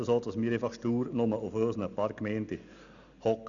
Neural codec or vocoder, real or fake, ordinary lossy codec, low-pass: none; real; none; 7.2 kHz